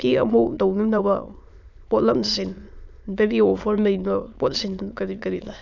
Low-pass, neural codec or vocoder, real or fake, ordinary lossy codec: 7.2 kHz; autoencoder, 22.05 kHz, a latent of 192 numbers a frame, VITS, trained on many speakers; fake; none